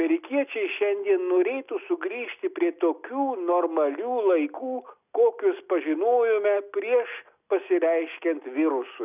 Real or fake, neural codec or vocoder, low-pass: real; none; 3.6 kHz